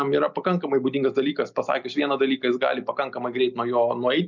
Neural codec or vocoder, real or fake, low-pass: none; real; 7.2 kHz